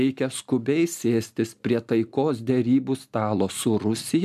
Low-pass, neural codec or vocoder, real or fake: 14.4 kHz; none; real